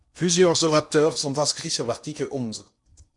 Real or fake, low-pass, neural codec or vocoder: fake; 10.8 kHz; codec, 16 kHz in and 24 kHz out, 0.8 kbps, FocalCodec, streaming, 65536 codes